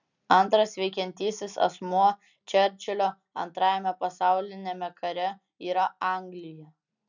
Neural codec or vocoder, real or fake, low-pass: none; real; 7.2 kHz